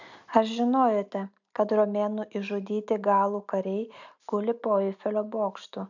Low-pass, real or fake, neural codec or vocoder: 7.2 kHz; real; none